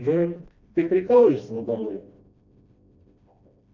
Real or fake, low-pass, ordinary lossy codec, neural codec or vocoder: fake; 7.2 kHz; MP3, 64 kbps; codec, 16 kHz, 1 kbps, FreqCodec, smaller model